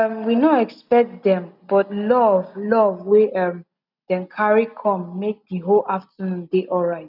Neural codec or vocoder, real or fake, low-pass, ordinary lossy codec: none; real; 5.4 kHz; none